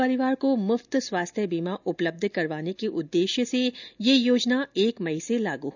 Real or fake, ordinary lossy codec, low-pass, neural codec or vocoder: real; none; 7.2 kHz; none